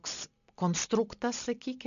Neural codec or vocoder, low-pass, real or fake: none; 7.2 kHz; real